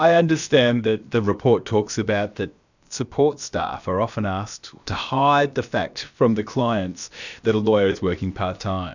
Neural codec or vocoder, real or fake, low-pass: codec, 16 kHz, about 1 kbps, DyCAST, with the encoder's durations; fake; 7.2 kHz